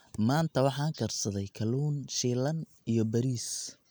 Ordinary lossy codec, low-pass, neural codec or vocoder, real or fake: none; none; none; real